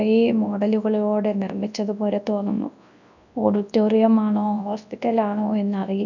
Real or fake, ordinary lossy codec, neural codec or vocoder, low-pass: fake; none; codec, 24 kHz, 0.9 kbps, WavTokenizer, large speech release; 7.2 kHz